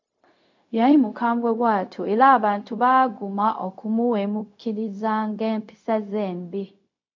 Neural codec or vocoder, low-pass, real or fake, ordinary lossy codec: codec, 16 kHz, 0.4 kbps, LongCat-Audio-Codec; 7.2 kHz; fake; MP3, 48 kbps